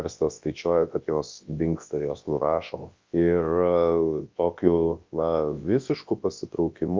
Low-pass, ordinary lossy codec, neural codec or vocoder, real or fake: 7.2 kHz; Opus, 24 kbps; codec, 24 kHz, 0.9 kbps, WavTokenizer, large speech release; fake